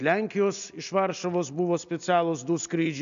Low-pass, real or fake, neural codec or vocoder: 7.2 kHz; real; none